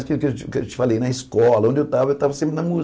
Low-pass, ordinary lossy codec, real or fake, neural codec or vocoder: none; none; real; none